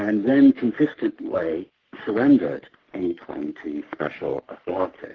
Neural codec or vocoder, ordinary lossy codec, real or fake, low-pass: codec, 44.1 kHz, 3.4 kbps, Pupu-Codec; Opus, 16 kbps; fake; 7.2 kHz